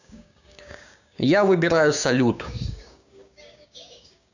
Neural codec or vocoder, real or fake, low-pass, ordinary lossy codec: codec, 16 kHz, 6 kbps, DAC; fake; 7.2 kHz; none